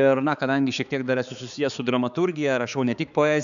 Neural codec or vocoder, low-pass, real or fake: codec, 16 kHz, 4 kbps, X-Codec, HuBERT features, trained on balanced general audio; 7.2 kHz; fake